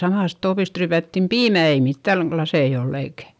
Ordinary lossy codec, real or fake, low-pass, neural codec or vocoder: none; real; none; none